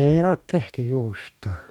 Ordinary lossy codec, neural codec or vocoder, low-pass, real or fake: MP3, 96 kbps; codec, 44.1 kHz, 2.6 kbps, DAC; 19.8 kHz; fake